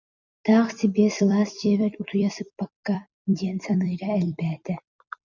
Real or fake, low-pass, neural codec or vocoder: fake; 7.2 kHz; vocoder, 44.1 kHz, 128 mel bands every 256 samples, BigVGAN v2